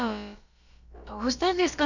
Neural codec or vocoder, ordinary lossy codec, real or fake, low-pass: codec, 16 kHz, about 1 kbps, DyCAST, with the encoder's durations; none; fake; 7.2 kHz